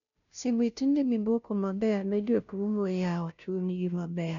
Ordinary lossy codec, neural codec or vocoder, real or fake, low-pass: none; codec, 16 kHz, 0.5 kbps, FunCodec, trained on Chinese and English, 25 frames a second; fake; 7.2 kHz